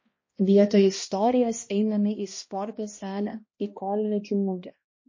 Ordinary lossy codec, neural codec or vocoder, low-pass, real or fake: MP3, 32 kbps; codec, 16 kHz, 1 kbps, X-Codec, HuBERT features, trained on balanced general audio; 7.2 kHz; fake